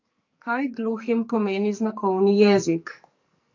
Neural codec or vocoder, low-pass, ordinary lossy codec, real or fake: codec, 44.1 kHz, 2.6 kbps, SNAC; 7.2 kHz; AAC, 48 kbps; fake